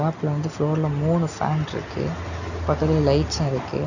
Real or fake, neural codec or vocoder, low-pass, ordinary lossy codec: real; none; 7.2 kHz; none